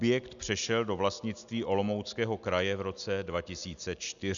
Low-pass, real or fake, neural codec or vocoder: 7.2 kHz; real; none